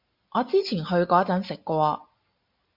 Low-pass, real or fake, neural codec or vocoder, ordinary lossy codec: 5.4 kHz; real; none; MP3, 48 kbps